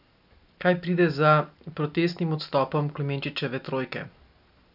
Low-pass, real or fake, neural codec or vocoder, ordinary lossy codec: 5.4 kHz; real; none; none